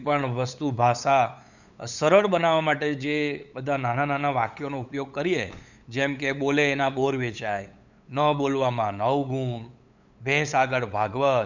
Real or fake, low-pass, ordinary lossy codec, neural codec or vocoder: fake; 7.2 kHz; none; codec, 16 kHz, 8 kbps, FunCodec, trained on LibriTTS, 25 frames a second